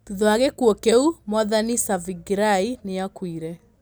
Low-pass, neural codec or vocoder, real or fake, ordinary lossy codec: none; none; real; none